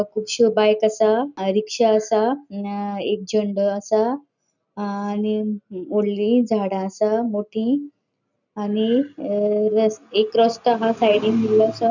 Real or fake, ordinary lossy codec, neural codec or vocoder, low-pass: real; none; none; 7.2 kHz